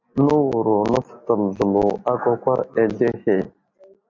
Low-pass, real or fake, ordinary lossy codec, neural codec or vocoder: 7.2 kHz; real; MP3, 48 kbps; none